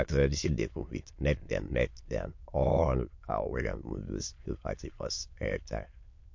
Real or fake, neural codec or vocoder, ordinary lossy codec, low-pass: fake; autoencoder, 22.05 kHz, a latent of 192 numbers a frame, VITS, trained on many speakers; MP3, 48 kbps; 7.2 kHz